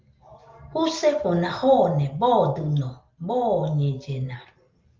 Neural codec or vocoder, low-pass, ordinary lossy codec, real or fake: none; 7.2 kHz; Opus, 32 kbps; real